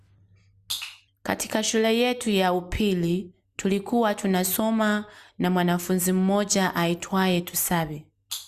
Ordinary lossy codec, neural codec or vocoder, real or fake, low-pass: Opus, 64 kbps; none; real; 14.4 kHz